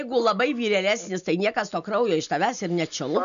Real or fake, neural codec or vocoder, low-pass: real; none; 7.2 kHz